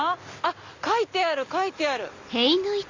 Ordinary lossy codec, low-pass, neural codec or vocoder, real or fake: MP3, 64 kbps; 7.2 kHz; none; real